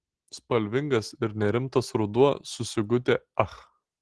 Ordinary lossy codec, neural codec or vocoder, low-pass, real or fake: Opus, 16 kbps; none; 10.8 kHz; real